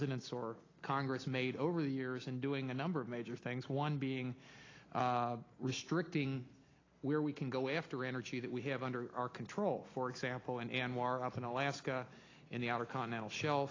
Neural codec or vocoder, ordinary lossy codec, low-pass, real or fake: none; AAC, 32 kbps; 7.2 kHz; real